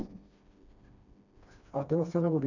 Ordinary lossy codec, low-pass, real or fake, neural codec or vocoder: none; 7.2 kHz; fake; codec, 16 kHz, 2 kbps, FreqCodec, smaller model